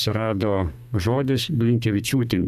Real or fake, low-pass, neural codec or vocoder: fake; 14.4 kHz; codec, 32 kHz, 1.9 kbps, SNAC